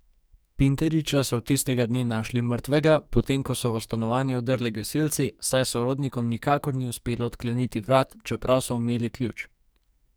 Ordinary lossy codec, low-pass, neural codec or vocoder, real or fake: none; none; codec, 44.1 kHz, 2.6 kbps, SNAC; fake